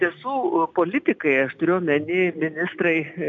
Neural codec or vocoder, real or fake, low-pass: none; real; 7.2 kHz